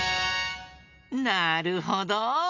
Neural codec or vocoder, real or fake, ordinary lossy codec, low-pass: none; real; MP3, 48 kbps; 7.2 kHz